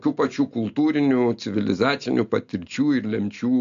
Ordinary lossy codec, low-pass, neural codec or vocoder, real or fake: AAC, 96 kbps; 7.2 kHz; none; real